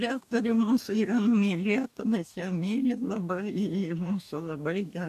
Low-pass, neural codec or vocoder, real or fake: 14.4 kHz; codec, 44.1 kHz, 2.6 kbps, DAC; fake